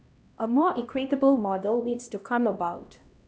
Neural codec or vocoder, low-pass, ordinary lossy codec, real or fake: codec, 16 kHz, 1 kbps, X-Codec, HuBERT features, trained on LibriSpeech; none; none; fake